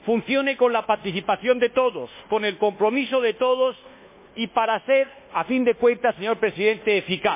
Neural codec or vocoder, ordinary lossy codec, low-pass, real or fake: codec, 24 kHz, 1.2 kbps, DualCodec; MP3, 24 kbps; 3.6 kHz; fake